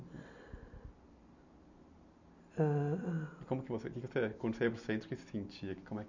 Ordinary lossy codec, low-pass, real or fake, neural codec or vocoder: none; 7.2 kHz; real; none